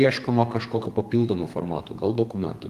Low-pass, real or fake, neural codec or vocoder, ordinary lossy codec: 14.4 kHz; fake; codec, 32 kHz, 1.9 kbps, SNAC; Opus, 24 kbps